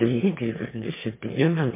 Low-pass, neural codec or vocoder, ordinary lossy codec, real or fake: 3.6 kHz; autoencoder, 22.05 kHz, a latent of 192 numbers a frame, VITS, trained on one speaker; MP3, 32 kbps; fake